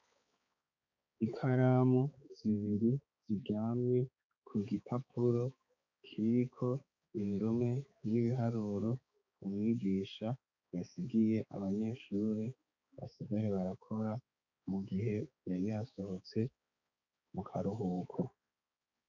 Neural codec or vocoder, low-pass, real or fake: codec, 16 kHz, 4 kbps, X-Codec, HuBERT features, trained on balanced general audio; 7.2 kHz; fake